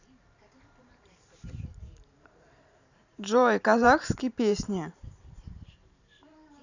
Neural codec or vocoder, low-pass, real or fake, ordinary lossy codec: vocoder, 44.1 kHz, 128 mel bands every 512 samples, BigVGAN v2; 7.2 kHz; fake; none